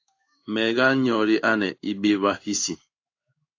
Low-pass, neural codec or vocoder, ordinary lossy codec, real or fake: 7.2 kHz; codec, 16 kHz in and 24 kHz out, 1 kbps, XY-Tokenizer; AAC, 48 kbps; fake